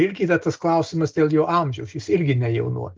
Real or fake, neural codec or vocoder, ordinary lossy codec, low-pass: real; none; Opus, 24 kbps; 7.2 kHz